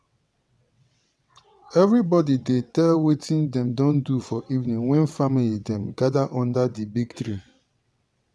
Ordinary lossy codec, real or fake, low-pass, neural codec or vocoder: none; fake; none; vocoder, 22.05 kHz, 80 mel bands, WaveNeXt